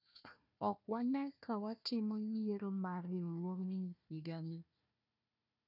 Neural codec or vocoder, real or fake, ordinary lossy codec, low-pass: codec, 16 kHz, 1 kbps, FunCodec, trained on Chinese and English, 50 frames a second; fake; none; 5.4 kHz